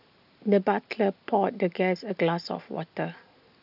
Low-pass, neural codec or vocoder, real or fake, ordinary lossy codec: 5.4 kHz; none; real; none